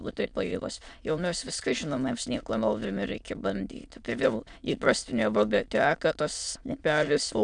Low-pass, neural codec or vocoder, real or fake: 9.9 kHz; autoencoder, 22.05 kHz, a latent of 192 numbers a frame, VITS, trained on many speakers; fake